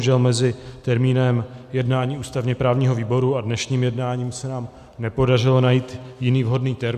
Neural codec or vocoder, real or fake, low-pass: none; real; 14.4 kHz